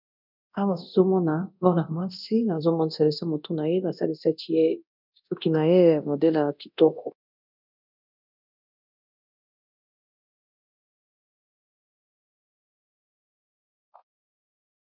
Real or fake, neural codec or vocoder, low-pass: fake; codec, 24 kHz, 0.9 kbps, DualCodec; 5.4 kHz